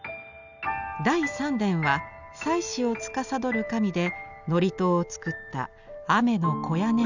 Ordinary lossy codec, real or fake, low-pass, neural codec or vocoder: none; real; 7.2 kHz; none